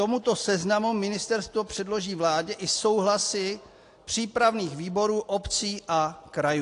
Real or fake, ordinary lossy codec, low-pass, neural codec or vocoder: real; AAC, 48 kbps; 10.8 kHz; none